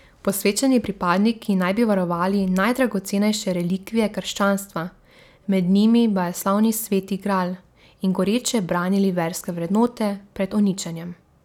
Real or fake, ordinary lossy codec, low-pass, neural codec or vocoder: real; none; 19.8 kHz; none